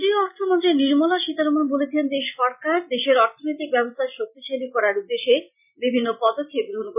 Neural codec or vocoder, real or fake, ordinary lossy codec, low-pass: none; real; none; 3.6 kHz